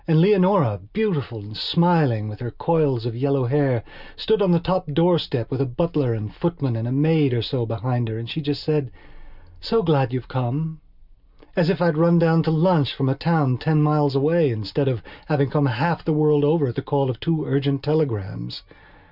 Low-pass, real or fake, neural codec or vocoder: 5.4 kHz; real; none